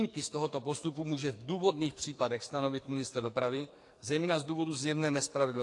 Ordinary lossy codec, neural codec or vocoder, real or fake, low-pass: AAC, 48 kbps; codec, 44.1 kHz, 2.6 kbps, SNAC; fake; 10.8 kHz